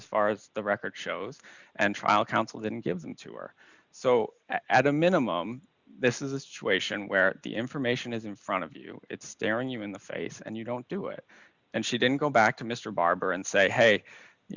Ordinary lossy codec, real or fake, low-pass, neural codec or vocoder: Opus, 64 kbps; real; 7.2 kHz; none